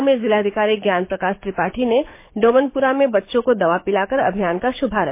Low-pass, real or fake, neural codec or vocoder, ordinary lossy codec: 3.6 kHz; fake; codec, 44.1 kHz, 7.8 kbps, DAC; MP3, 24 kbps